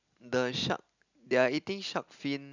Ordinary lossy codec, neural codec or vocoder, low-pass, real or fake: none; none; 7.2 kHz; real